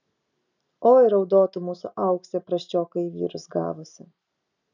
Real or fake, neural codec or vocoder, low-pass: real; none; 7.2 kHz